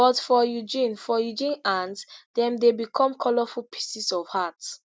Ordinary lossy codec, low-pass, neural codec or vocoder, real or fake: none; none; none; real